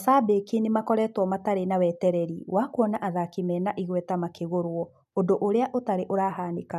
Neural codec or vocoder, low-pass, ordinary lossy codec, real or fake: none; 14.4 kHz; none; real